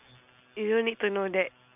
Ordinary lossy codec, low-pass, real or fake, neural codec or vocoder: none; 3.6 kHz; real; none